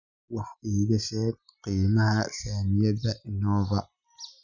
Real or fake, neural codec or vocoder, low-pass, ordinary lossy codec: real; none; 7.2 kHz; none